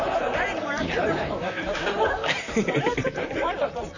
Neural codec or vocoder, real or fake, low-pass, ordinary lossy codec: vocoder, 44.1 kHz, 128 mel bands, Pupu-Vocoder; fake; 7.2 kHz; none